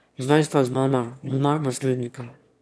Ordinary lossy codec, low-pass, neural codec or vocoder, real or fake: none; none; autoencoder, 22.05 kHz, a latent of 192 numbers a frame, VITS, trained on one speaker; fake